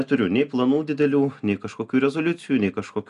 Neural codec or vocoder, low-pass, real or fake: none; 10.8 kHz; real